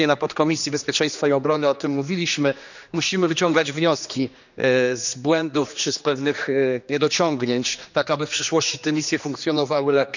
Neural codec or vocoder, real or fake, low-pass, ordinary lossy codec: codec, 16 kHz, 2 kbps, X-Codec, HuBERT features, trained on general audio; fake; 7.2 kHz; none